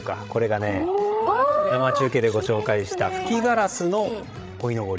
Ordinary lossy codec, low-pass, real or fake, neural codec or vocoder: none; none; fake; codec, 16 kHz, 16 kbps, FreqCodec, larger model